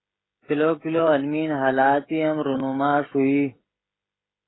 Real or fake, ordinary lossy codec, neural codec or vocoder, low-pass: fake; AAC, 16 kbps; codec, 16 kHz, 16 kbps, FreqCodec, smaller model; 7.2 kHz